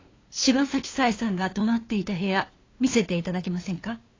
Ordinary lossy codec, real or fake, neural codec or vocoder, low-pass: AAC, 32 kbps; fake; codec, 16 kHz, 2 kbps, FunCodec, trained on LibriTTS, 25 frames a second; 7.2 kHz